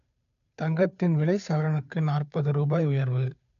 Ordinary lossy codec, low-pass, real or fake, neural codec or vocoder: none; 7.2 kHz; fake; codec, 16 kHz, 2 kbps, FunCodec, trained on Chinese and English, 25 frames a second